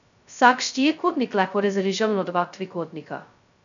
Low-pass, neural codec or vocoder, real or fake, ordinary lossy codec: 7.2 kHz; codec, 16 kHz, 0.2 kbps, FocalCodec; fake; none